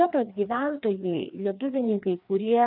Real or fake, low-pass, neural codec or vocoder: fake; 5.4 kHz; codec, 16 kHz, 2 kbps, FreqCodec, larger model